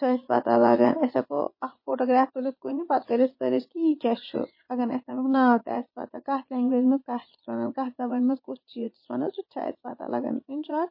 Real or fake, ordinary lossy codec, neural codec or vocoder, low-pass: fake; MP3, 24 kbps; vocoder, 22.05 kHz, 80 mel bands, Vocos; 5.4 kHz